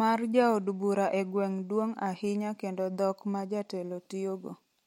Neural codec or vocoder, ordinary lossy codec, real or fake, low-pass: none; MP3, 64 kbps; real; 19.8 kHz